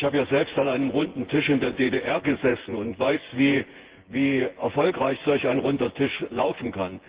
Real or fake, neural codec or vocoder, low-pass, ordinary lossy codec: fake; vocoder, 24 kHz, 100 mel bands, Vocos; 3.6 kHz; Opus, 16 kbps